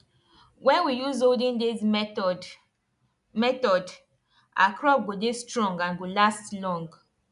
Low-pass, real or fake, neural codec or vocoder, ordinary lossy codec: 10.8 kHz; real; none; none